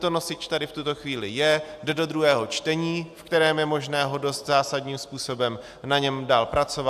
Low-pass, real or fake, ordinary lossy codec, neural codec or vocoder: 14.4 kHz; real; AAC, 96 kbps; none